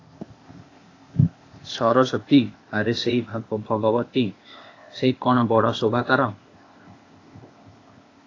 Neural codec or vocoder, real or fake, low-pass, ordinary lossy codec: codec, 16 kHz, 0.8 kbps, ZipCodec; fake; 7.2 kHz; AAC, 32 kbps